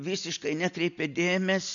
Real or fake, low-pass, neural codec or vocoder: real; 7.2 kHz; none